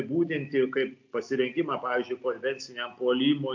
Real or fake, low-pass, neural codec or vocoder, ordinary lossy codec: real; 7.2 kHz; none; MP3, 48 kbps